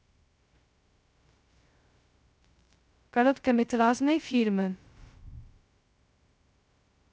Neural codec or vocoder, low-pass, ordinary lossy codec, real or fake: codec, 16 kHz, 0.2 kbps, FocalCodec; none; none; fake